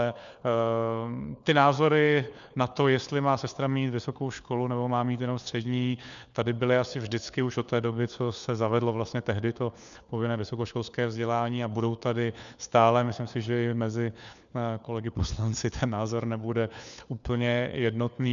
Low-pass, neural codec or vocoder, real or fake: 7.2 kHz; codec, 16 kHz, 4 kbps, FunCodec, trained on LibriTTS, 50 frames a second; fake